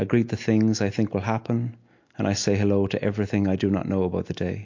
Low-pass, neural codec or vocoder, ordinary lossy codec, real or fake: 7.2 kHz; none; MP3, 48 kbps; real